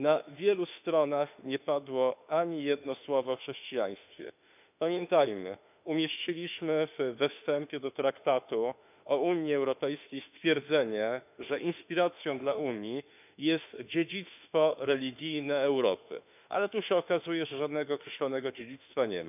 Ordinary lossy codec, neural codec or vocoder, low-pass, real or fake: none; autoencoder, 48 kHz, 32 numbers a frame, DAC-VAE, trained on Japanese speech; 3.6 kHz; fake